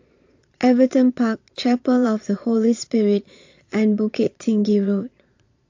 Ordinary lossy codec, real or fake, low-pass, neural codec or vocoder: AAC, 48 kbps; fake; 7.2 kHz; vocoder, 22.05 kHz, 80 mel bands, Vocos